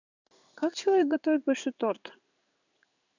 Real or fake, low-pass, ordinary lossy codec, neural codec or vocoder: fake; 7.2 kHz; none; codec, 16 kHz in and 24 kHz out, 1 kbps, XY-Tokenizer